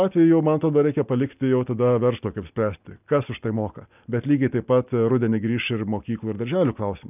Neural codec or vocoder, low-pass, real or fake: none; 3.6 kHz; real